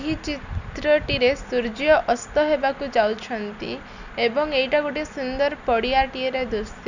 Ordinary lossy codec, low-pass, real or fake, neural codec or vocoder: none; 7.2 kHz; real; none